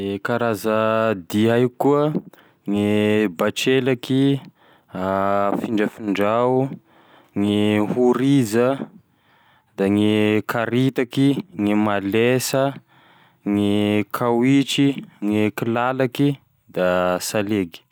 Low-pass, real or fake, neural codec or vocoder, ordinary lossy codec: none; real; none; none